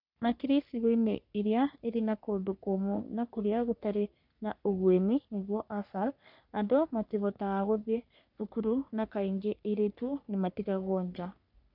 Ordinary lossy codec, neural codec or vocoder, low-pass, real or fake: none; codec, 44.1 kHz, 3.4 kbps, Pupu-Codec; 5.4 kHz; fake